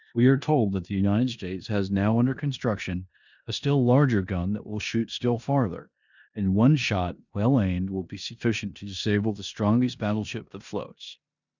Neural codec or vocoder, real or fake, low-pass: codec, 16 kHz in and 24 kHz out, 0.9 kbps, LongCat-Audio-Codec, four codebook decoder; fake; 7.2 kHz